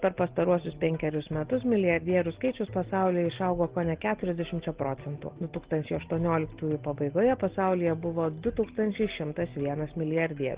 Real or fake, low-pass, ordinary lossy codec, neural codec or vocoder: real; 3.6 kHz; Opus, 16 kbps; none